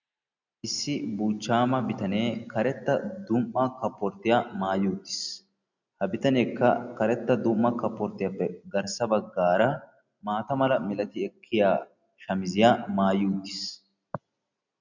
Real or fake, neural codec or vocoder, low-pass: real; none; 7.2 kHz